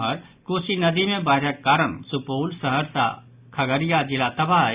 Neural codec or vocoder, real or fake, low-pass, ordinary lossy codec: none; real; 3.6 kHz; Opus, 64 kbps